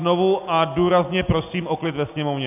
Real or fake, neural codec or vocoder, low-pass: real; none; 3.6 kHz